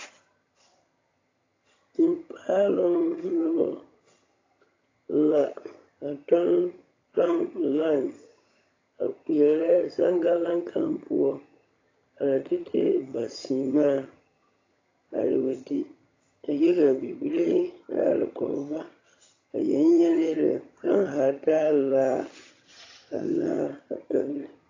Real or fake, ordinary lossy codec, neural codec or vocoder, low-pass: fake; AAC, 32 kbps; vocoder, 22.05 kHz, 80 mel bands, HiFi-GAN; 7.2 kHz